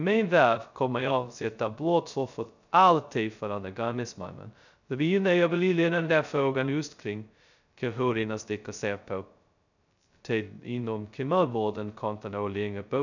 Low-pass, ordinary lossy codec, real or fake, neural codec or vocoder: 7.2 kHz; none; fake; codec, 16 kHz, 0.2 kbps, FocalCodec